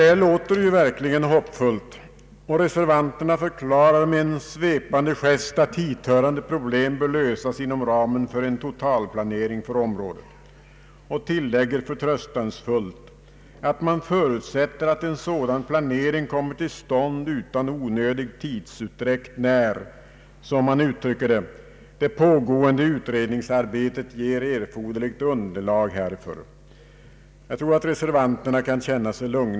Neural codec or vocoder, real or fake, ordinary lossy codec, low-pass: none; real; none; none